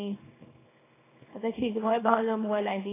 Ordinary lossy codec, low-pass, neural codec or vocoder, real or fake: AAC, 16 kbps; 3.6 kHz; codec, 24 kHz, 0.9 kbps, WavTokenizer, small release; fake